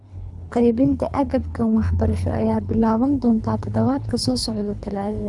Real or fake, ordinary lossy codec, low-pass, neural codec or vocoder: fake; none; 10.8 kHz; codec, 24 kHz, 3 kbps, HILCodec